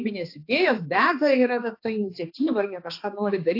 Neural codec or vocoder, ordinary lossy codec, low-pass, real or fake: codec, 16 kHz, 2 kbps, X-Codec, HuBERT features, trained on balanced general audio; AAC, 32 kbps; 5.4 kHz; fake